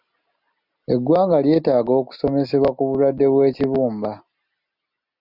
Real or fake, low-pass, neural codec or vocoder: real; 5.4 kHz; none